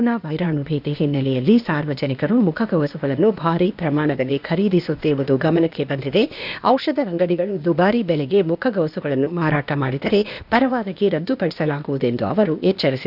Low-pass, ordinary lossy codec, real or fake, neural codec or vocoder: 5.4 kHz; none; fake; codec, 16 kHz, 0.8 kbps, ZipCodec